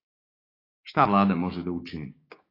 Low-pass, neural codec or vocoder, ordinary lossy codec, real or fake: 5.4 kHz; codec, 16 kHz, 6 kbps, DAC; AAC, 24 kbps; fake